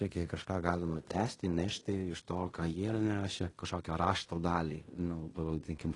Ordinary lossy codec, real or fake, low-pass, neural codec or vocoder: AAC, 32 kbps; fake; 10.8 kHz; codec, 16 kHz in and 24 kHz out, 0.9 kbps, LongCat-Audio-Codec, fine tuned four codebook decoder